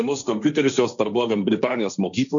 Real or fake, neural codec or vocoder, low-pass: fake; codec, 16 kHz, 1.1 kbps, Voila-Tokenizer; 7.2 kHz